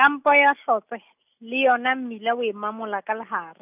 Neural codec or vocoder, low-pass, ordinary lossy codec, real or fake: none; 3.6 kHz; none; real